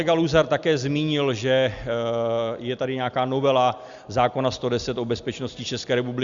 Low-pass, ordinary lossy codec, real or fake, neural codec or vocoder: 7.2 kHz; Opus, 64 kbps; real; none